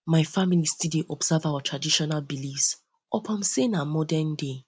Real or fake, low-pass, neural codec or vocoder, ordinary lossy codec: real; none; none; none